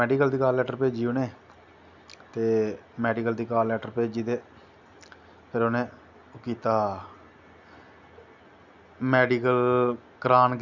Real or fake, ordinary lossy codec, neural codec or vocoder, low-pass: real; none; none; 7.2 kHz